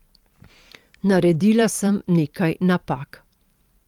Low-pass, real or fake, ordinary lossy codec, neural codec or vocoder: 19.8 kHz; fake; Opus, 32 kbps; vocoder, 44.1 kHz, 128 mel bands every 512 samples, BigVGAN v2